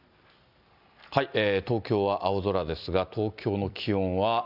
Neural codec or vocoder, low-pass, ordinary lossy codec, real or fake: none; 5.4 kHz; none; real